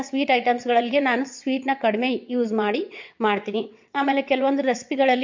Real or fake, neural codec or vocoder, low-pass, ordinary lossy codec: fake; vocoder, 22.05 kHz, 80 mel bands, WaveNeXt; 7.2 kHz; MP3, 48 kbps